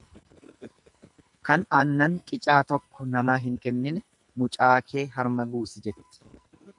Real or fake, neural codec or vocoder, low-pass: fake; codec, 44.1 kHz, 2.6 kbps, SNAC; 10.8 kHz